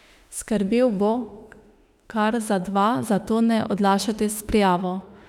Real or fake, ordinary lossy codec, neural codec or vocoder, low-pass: fake; none; autoencoder, 48 kHz, 32 numbers a frame, DAC-VAE, trained on Japanese speech; 19.8 kHz